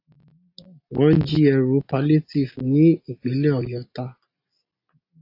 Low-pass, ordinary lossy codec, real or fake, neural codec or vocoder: 5.4 kHz; AAC, 32 kbps; real; none